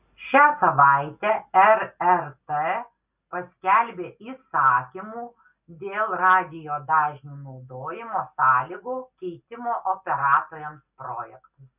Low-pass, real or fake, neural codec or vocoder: 3.6 kHz; real; none